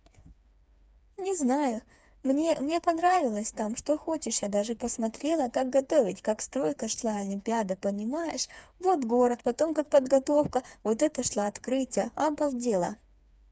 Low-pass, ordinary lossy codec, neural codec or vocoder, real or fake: none; none; codec, 16 kHz, 4 kbps, FreqCodec, smaller model; fake